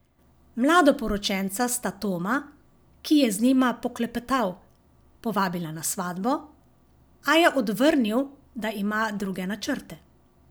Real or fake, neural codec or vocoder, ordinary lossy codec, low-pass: real; none; none; none